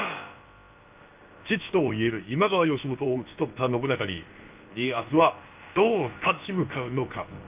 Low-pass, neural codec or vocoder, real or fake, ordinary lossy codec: 3.6 kHz; codec, 16 kHz, about 1 kbps, DyCAST, with the encoder's durations; fake; Opus, 24 kbps